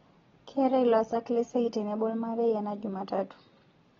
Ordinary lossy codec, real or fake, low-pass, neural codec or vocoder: AAC, 24 kbps; real; 7.2 kHz; none